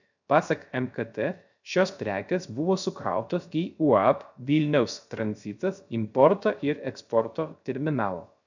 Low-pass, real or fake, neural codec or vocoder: 7.2 kHz; fake; codec, 16 kHz, 0.3 kbps, FocalCodec